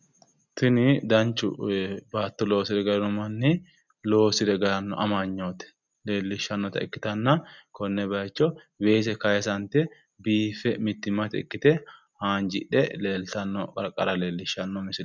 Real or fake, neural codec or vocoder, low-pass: real; none; 7.2 kHz